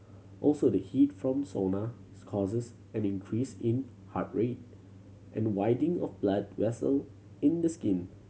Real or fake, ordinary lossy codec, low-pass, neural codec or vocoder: real; none; none; none